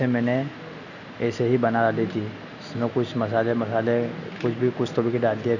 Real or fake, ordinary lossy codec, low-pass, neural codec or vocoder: real; none; 7.2 kHz; none